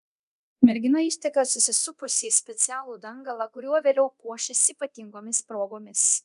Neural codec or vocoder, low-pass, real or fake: codec, 24 kHz, 0.9 kbps, DualCodec; 10.8 kHz; fake